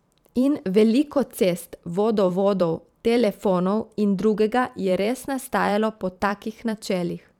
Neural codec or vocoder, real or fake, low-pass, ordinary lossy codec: vocoder, 44.1 kHz, 128 mel bands every 512 samples, BigVGAN v2; fake; 19.8 kHz; none